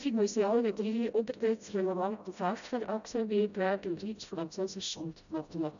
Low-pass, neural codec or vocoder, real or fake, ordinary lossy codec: 7.2 kHz; codec, 16 kHz, 0.5 kbps, FreqCodec, smaller model; fake; none